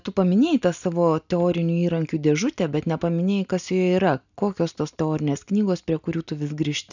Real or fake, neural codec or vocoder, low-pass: real; none; 7.2 kHz